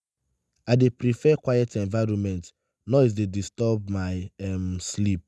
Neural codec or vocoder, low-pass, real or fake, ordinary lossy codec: none; none; real; none